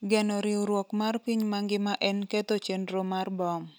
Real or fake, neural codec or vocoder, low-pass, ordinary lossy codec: real; none; none; none